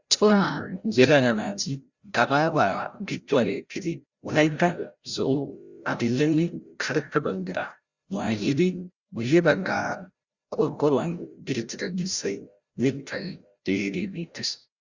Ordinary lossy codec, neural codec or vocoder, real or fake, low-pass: Opus, 64 kbps; codec, 16 kHz, 0.5 kbps, FreqCodec, larger model; fake; 7.2 kHz